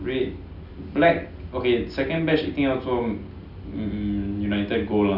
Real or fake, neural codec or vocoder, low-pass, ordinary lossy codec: real; none; 5.4 kHz; none